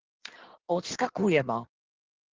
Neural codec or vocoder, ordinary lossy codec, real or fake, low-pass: codec, 16 kHz in and 24 kHz out, 1.1 kbps, FireRedTTS-2 codec; Opus, 16 kbps; fake; 7.2 kHz